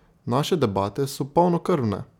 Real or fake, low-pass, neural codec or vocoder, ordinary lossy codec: real; 19.8 kHz; none; none